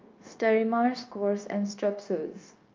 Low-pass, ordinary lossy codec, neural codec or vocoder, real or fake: 7.2 kHz; Opus, 24 kbps; codec, 16 kHz, 0.9 kbps, LongCat-Audio-Codec; fake